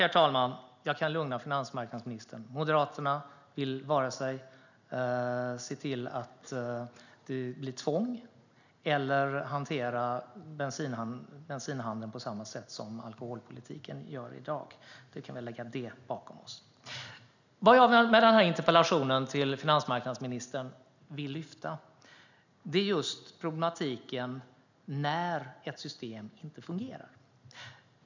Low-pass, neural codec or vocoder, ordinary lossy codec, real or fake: 7.2 kHz; none; none; real